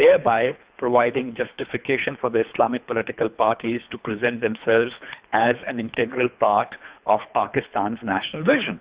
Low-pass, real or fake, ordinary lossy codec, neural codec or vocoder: 3.6 kHz; fake; Opus, 64 kbps; codec, 24 kHz, 3 kbps, HILCodec